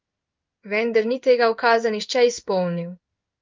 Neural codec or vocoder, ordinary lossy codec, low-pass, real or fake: codec, 16 kHz in and 24 kHz out, 1 kbps, XY-Tokenizer; Opus, 24 kbps; 7.2 kHz; fake